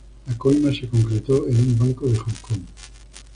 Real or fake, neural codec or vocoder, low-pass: real; none; 9.9 kHz